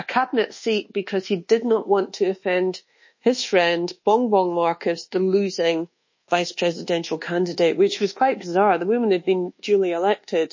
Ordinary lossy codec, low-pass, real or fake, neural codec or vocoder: MP3, 32 kbps; 7.2 kHz; fake; codec, 24 kHz, 1.2 kbps, DualCodec